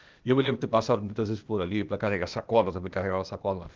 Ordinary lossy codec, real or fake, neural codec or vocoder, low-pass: Opus, 24 kbps; fake; codec, 16 kHz, 0.8 kbps, ZipCodec; 7.2 kHz